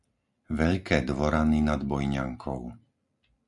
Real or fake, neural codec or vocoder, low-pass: real; none; 10.8 kHz